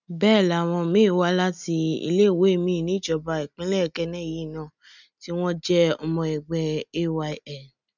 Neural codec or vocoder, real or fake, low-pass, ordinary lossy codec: none; real; 7.2 kHz; none